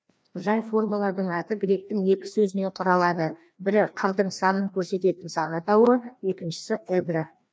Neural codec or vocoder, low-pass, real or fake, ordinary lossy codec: codec, 16 kHz, 1 kbps, FreqCodec, larger model; none; fake; none